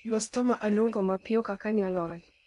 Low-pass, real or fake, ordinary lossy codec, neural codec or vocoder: 10.8 kHz; fake; none; codec, 16 kHz in and 24 kHz out, 0.6 kbps, FocalCodec, streaming, 2048 codes